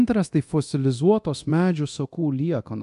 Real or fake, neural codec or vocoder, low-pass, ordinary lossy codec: fake; codec, 24 kHz, 0.9 kbps, DualCodec; 10.8 kHz; AAC, 64 kbps